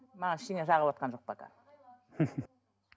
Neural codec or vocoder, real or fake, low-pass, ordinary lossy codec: none; real; none; none